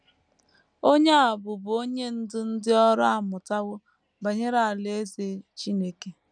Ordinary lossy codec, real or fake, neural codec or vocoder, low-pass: none; real; none; 9.9 kHz